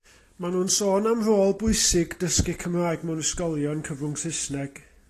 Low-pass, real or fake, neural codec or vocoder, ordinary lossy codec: 14.4 kHz; real; none; AAC, 48 kbps